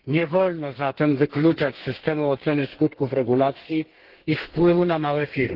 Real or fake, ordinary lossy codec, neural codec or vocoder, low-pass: fake; Opus, 16 kbps; codec, 32 kHz, 1.9 kbps, SNAC; 5.4 kHz